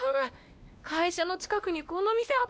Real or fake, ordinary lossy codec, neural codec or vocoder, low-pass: fake; none; codec, 16 kHz, 1 kbps, X-Codec, WavLM features, trained on Multilingual LibriSpeech; none